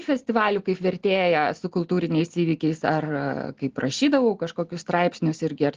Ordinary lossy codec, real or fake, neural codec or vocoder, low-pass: Opus, 16 kbps; real; none; 7.2 kHz